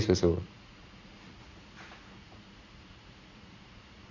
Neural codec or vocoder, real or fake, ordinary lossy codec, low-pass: vocoder, 44.1 kHz, 80 mel bands, Vocos; fake; Opus, 64 kbps; 7.2 kHz